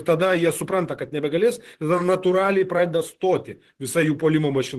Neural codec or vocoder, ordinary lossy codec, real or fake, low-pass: vocoder, 44.1 kHz, 128 mel bands, Pupu-Vocoder; Opus, 16 kbps; fake; 14.4 kHz